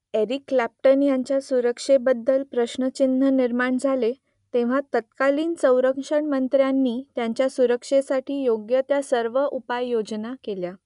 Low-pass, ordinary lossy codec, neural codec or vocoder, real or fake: 10.8 kHz; MP3, 96 kbps; none; real